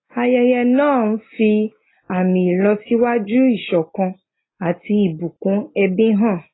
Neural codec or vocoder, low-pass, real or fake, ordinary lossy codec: none; 7.2 kHz; real; AAC, 16 kbps